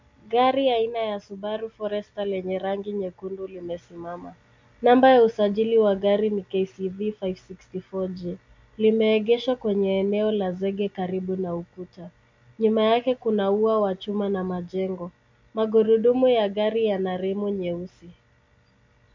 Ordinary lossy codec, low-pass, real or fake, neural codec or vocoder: MP3, 64 kbps; 7.2 kHz; real; none